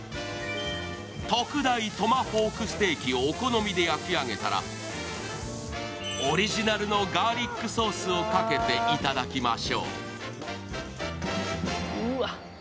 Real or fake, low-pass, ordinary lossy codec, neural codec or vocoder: real; none; none; none